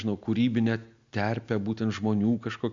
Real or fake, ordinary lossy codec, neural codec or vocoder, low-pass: real; AAC, 64 kbps; none; 7.2 kHz